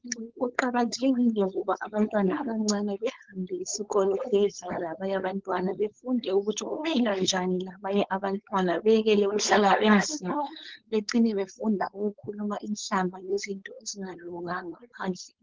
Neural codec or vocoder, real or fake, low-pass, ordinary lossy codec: codec, 16 kHz, 4.8 kbps, FACodec; fake; 7.2 kHz; Opus, 16 kbps